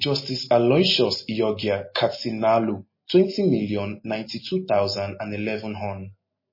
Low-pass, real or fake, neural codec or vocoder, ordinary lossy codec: 5.4 kHz; real; none; MP3, 24 kbps